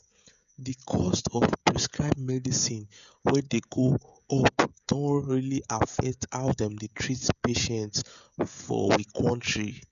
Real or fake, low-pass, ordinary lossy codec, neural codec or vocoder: fake; 7.2 kHz; none; codec, 16 kHz, 16 kbps, FreqCodec, smaller model